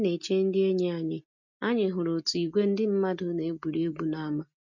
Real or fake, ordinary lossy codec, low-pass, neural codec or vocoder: real; none; 7.2 kHz; none